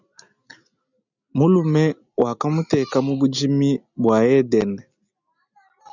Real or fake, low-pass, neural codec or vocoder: real; 7.2 kHz; none